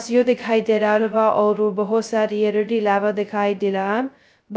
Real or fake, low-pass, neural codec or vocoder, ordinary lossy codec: fake; none; codec, 16 kHz, 0.2 kbps, FocalCodec; none